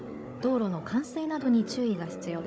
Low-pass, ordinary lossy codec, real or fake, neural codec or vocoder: none; none; fake; codec, 16 kHz, 16 kbps, FunCodec, trained on Chinese and English, 50 frames a second